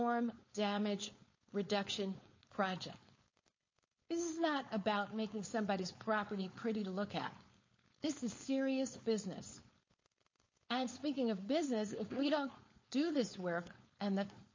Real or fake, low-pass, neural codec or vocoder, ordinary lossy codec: fake; 7.2 kHz; codec, 16 kHz, 4.8 kbps, FACodec; MP3, 32 kbps